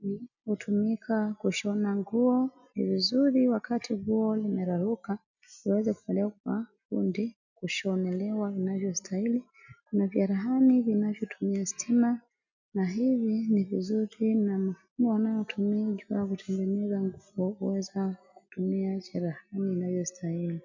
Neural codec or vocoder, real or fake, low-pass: none; real; 7.2 kHz